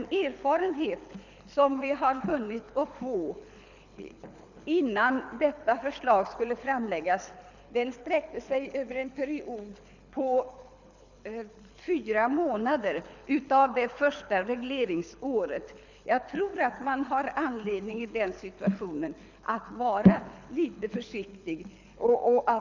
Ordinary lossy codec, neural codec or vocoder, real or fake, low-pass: none; codec, 24 kHz, 6 kbps, HILCodec; fake; 7.2 kHz